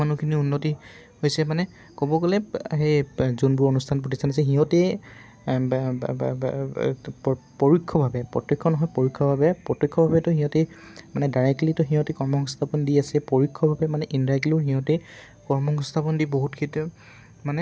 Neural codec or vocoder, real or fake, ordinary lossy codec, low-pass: none; real; none; none